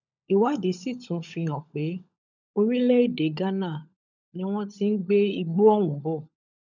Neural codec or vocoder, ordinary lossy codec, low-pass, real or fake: codec, 16 kHz, 16 kbps, FunCodec, trained on LibriTTS, 50 frames a second; none; 7.2 kHz; fake